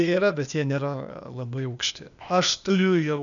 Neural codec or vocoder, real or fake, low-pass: codec, 16 kHz, 0.8 kbps, ZipCodec; fake; 7.2 kHz